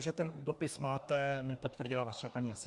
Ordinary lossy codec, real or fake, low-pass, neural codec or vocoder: Opus, 64 kbps; fake; 10.8 kHz; codec, 24 kHz, 1 kbps, SNAC